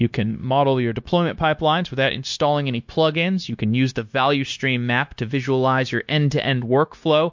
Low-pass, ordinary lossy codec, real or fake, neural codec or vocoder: 7.2 kHz; MP3, 48 kbps; fake; codec, 16 kHz, 0.9 kbps, LongCat-Audio-Codec